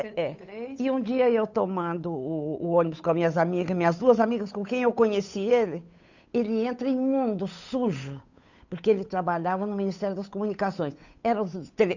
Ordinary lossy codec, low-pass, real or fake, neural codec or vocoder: none; 7.2 kHz; fake; codec, 16 kHz, 8 kbps, FunCodec, trained on Chinese and English, 25 frames a second